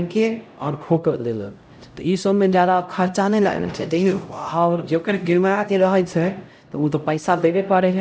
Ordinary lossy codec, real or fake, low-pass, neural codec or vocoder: none; fake; none; codec, 16 kHz, 0.5 kbps, X-Codec, HuBERT features, trained on LibriSpeech